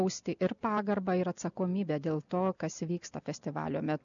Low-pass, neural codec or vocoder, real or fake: 7.2 kHz; none; real